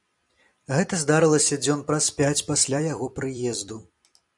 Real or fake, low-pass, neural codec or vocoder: fake; 10.8 kHz; vocoder, 44.1 kHz, 128 mel bands every 256 samples, BigVGAN v2